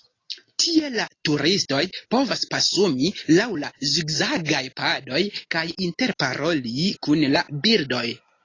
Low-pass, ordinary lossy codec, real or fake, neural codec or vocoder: 7.2 kHz; AAC, 32 kbps; real; none